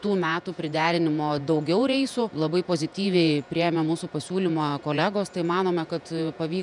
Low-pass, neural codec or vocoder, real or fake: 10.8 kHz; vocoder, 48 kHz, 128 mel bands, Vocos; fake